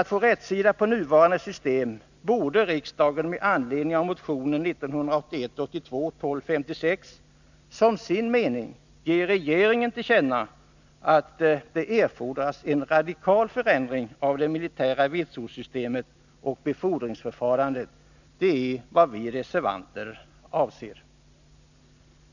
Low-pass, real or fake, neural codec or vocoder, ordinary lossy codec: 7.2 kHz; real; none; none